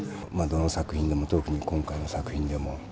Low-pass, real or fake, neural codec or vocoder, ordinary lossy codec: none; real; none; none